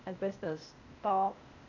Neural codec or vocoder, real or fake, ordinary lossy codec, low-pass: codec, 16 kHz, 0.8 kbps, ZipCodec; fake; none; 7.2 kHz